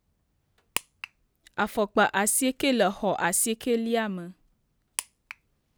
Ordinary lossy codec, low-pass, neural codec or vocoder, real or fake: none; none; none; real